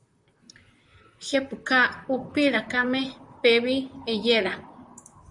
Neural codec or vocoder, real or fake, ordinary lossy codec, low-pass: vocoder, 44.1 kHz, 128 mel bands, Pupu-Vocoder; fake; MP3, 96 kbps; 10.8 kHz